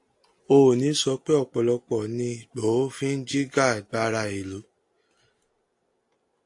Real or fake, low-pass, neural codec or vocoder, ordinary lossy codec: real; 10.8 kHz; none; AAC, 64 kbps